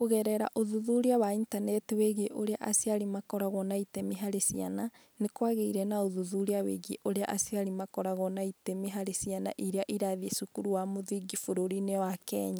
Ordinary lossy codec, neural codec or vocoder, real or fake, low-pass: none; none; real; none